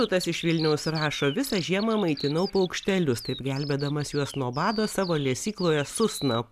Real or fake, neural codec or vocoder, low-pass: real; none; 14.4 kHz